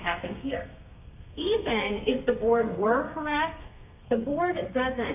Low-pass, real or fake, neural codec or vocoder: 3.6 kHz; fake; codec, 32 kHz, 1.9 kbps, SNAC